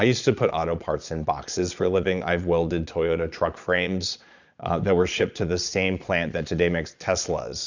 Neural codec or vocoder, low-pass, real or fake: vocoder, 22.05 kHz, 80 mel bands, Vocos; 7.2 kHz; fake